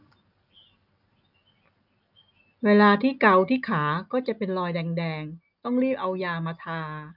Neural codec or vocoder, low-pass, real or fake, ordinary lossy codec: none; 5.4 kHz; real; none